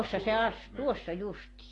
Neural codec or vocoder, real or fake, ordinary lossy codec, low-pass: none; real; AAC, 32 kbps; 10.8 kHz